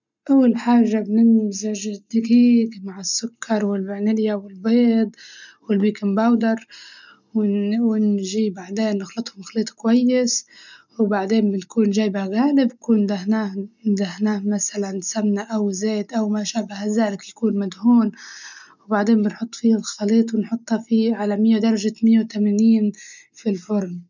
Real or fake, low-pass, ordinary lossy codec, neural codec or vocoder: real; 7.2 kHz; none; none